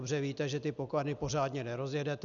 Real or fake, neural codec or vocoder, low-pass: real; none; 7.2 kHz